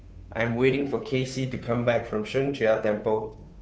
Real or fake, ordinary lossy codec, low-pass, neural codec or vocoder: fake; none; none; codec, 16 kHz, 2 kbps, FunCodec, trained on Chinese and English, 25 frames a second